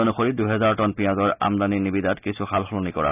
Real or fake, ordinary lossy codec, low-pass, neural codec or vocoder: real; none; 3.6 kHz; none